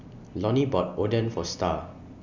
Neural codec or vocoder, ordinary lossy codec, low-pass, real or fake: none; none; 7.2 kHz; real